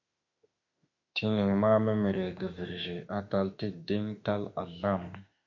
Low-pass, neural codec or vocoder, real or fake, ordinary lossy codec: 7.2 kHz; autoencoder, 48 kHz, 32 numbers a frame, DAC-VAE, trained on Japanese speech; fake; MP3, 48 kbps